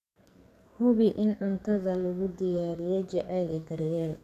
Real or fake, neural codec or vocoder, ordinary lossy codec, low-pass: fake; codec, 44.1 kHz, 2.6 kbps, SNAC; none; 14.4 kHz